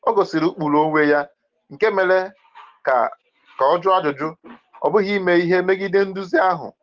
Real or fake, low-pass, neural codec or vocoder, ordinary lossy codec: real; 7.2 kHz; none; Opus, 16 kbps